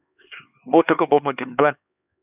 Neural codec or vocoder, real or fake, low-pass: codec, 16 kHz, 2 kbps, X-Codec, HuBERT features, trained on LibriSpeech; fake; 3.6 kHz